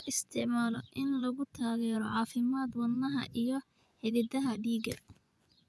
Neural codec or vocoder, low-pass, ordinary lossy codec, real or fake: vocoder, 24 kHz, 100 mel bands, Vocos; none; none; fake